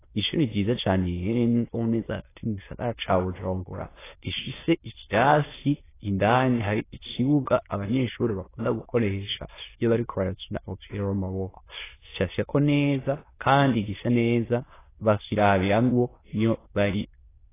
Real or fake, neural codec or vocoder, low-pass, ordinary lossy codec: fake; autoencoder, 22.05 kHz, a latent of 192 numbers a frame, VITS, trained on many speakers; 3.6 kHz; AAC, 16 kbps